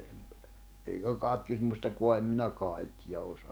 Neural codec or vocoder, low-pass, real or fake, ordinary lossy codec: codec, 44.1 kHz, 7.8 kbps, DAC; none; fake; none